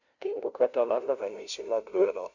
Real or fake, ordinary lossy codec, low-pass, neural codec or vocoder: fake; none; 7.2 kHz; codec, 16 kHz, 0.5 kbps, FunCodec, trained on LibriTTS, 25 frames a second